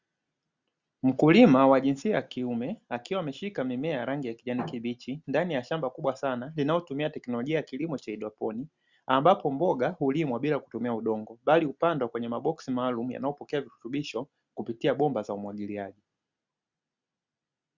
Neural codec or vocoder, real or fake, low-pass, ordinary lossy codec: none; real; 7.2 kHz; Opus, 64 kbps